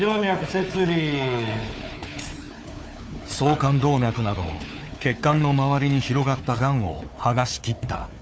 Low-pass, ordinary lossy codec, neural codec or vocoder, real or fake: none; none; codec, 16 kHz, 4 kbps, FunCodec, trained on Chinese and English, 50 frames a second; fake